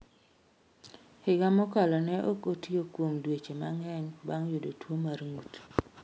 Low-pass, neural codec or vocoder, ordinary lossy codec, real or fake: none; none; none; real